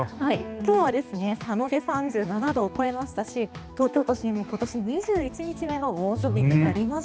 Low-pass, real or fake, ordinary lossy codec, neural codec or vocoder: none; fake; none; codec, 16 kHz, 2 kbps, X-Codec, HuBERT features, trained on balanced general audio